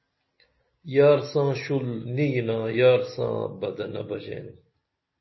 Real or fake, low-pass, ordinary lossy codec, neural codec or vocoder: real; 7.2 kHz; MP3, 24 kbps; none